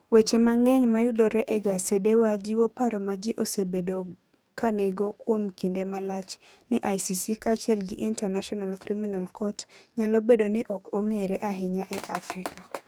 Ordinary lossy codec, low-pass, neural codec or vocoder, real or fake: none; none; codec, 44.1 kHz, 2.6 kbps, DAC; fake